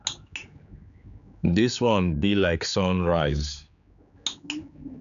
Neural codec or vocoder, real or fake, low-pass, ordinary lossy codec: codec, 16 kHz, 4 kbps, X-Codec, HuBERT features, trained on general audio; fake; 7.2 kHz; none